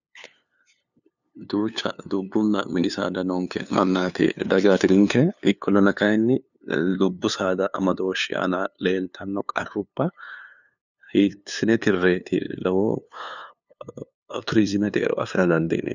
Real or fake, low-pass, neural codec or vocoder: fake; 7.2 kHz; codec, 16 kHz, 2 kbps, FunCodec, trained on LibriTTS, 25 frames a second